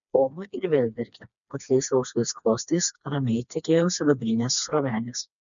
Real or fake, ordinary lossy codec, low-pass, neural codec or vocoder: fake; MP3, 96 kbps; 7.2 kHz; codec, 16 kHz, 2 kbps, FreqCodec, smaller model